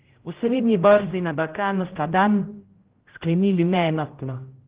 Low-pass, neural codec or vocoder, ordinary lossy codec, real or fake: 3.6 kHz; codec, 16 kHz, 0.5 kbps, X-Codec, HuBERT features, trained on general audio; Opus, 16 kbps; fake